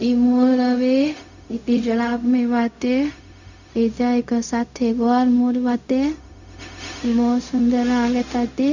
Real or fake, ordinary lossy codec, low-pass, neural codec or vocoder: fake; none; 7.2 kHz; codec, 16 kHz, 0.4 kbps, LongCat-Audio-Codec